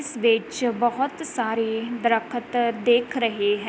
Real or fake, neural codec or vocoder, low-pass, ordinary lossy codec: real; none; none; none